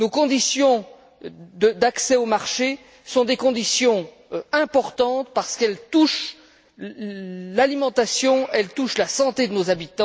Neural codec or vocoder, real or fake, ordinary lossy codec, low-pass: none; real; none; none